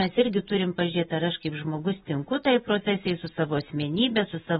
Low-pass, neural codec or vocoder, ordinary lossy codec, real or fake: 19.8 kHz; none; AAC, 16 kbps; real